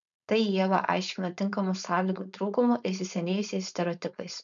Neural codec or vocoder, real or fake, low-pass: codec, 16 kHz, 4.8 kbps, FACodec; fake; 7.2 kHz